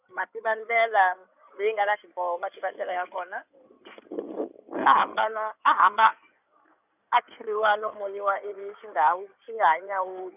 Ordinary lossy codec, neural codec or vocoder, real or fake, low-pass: none; codec, 16 kHz in and 24 kHz out, 2.2 kbps, FireRedTTS-2 codec; fake; 3.6 kHz